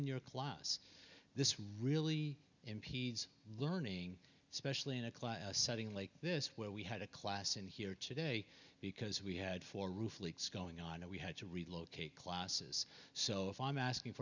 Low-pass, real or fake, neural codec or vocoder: 7.2 kHz; real; none